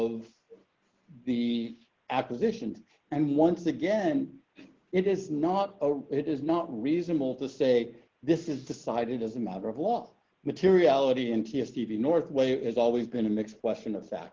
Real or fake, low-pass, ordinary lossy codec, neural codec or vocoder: real; 7.2 kHz; Opus, 16 kbps; none